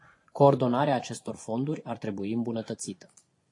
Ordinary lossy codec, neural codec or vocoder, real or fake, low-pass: AAC, 48 kbps; vocoder, 24 kHz, 100 mel bands, Vocos; fake; 10.8 kHz